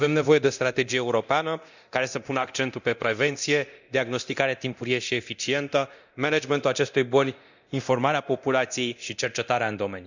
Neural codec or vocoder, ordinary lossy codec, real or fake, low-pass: codec, 24 kHz, 0.9 kbps, DualCodec; none; fake; 7.2 kHz